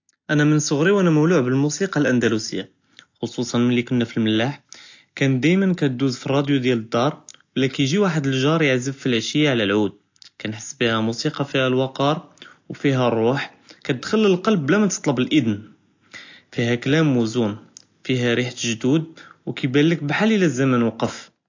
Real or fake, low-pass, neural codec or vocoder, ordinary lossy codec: real; 7.2 kHz; none; AAC, 48 kbps